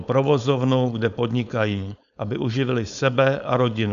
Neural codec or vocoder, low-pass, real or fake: codec, 16 kHz, 4.8 kbps, FACodec; 7.2 kHz; fake